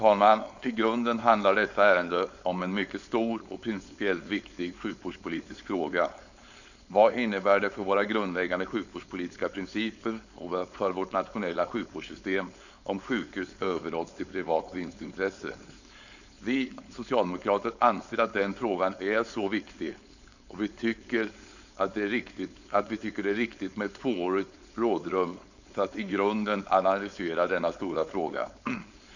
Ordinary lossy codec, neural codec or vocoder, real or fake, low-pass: none; codec, 16 kHz, 4.8 kbps, FACodec; fake; 7.2 kHz